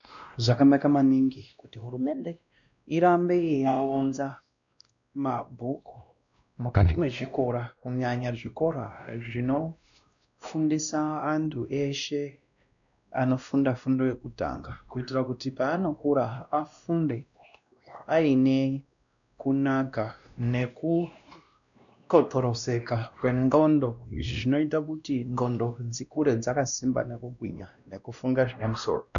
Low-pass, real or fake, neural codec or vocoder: 7.2 kHz; fake; codec, 16 kHz, 1 kbps, X-Codec, WavLM features, trained on Multilingual LibriSpeech